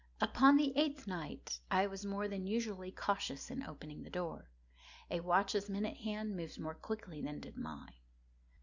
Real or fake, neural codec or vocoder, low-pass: real; none; 7.2 kHz